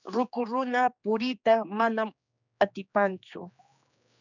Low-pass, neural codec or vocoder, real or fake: 7.2 kHz; codec, 16 kHz, 2 kbps, X-Codec, HuBERT features, trained on general audio; fake